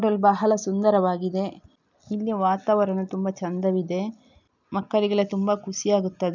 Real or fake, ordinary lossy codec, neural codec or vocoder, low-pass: real; none; none; 7.2 kHz